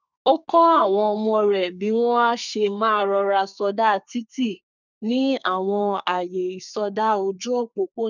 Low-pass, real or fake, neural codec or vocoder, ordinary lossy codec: 7.2 kHz; fake; codec, 32 kHz, 1.9 kbps, SNAC; none